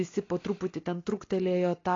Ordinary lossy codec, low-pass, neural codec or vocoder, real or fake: AAC, 32 kbps; 7.2 kHz; none; real